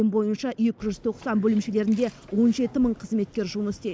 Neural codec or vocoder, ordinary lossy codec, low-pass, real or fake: none; none; none; real